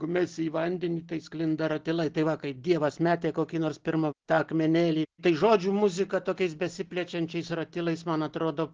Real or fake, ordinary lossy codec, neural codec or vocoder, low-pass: real; Opus, 16 kbps; none; 7.2 kHz